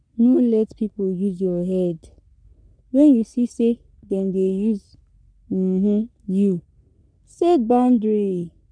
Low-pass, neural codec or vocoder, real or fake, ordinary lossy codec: 9.9 kHz; codec, 44.1 kHz, 3.4 kbps, Pupu-Codec; fake; none